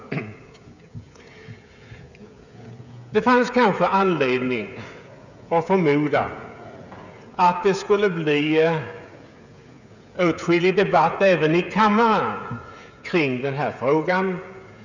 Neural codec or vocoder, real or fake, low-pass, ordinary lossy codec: codec, 16 kHz, 16 kbps, FreqCodec, smaller model; fake; 7.2 kHz; none